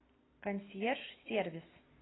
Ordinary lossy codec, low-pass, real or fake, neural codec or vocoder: AAC, 16 kbps; 7.2 kHz; real; none